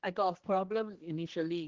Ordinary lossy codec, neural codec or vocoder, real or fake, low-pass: Opus, 16 kbps; codec, 16 kHz, 2 kbps, X-Codec, HuBERT features, trained on general audio; fake; 7.2 kHz